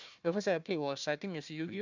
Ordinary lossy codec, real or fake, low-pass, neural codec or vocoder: none; fake; 7.2 kHz; codec, 16 kHz, 1 kbps, FunCodec, trained on Chinese and English, 50 frames a second